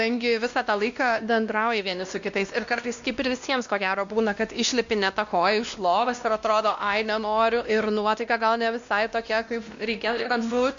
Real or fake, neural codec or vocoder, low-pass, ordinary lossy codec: fake; codec, 16 kHz, 1 kbps, X-Codec, WavLM features, trained on Multilingual LibriSpeech; 7.2 kHz; MP3, 64 kbps